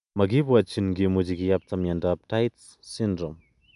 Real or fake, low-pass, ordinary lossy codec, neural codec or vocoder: real; 10.8 kHz; none; none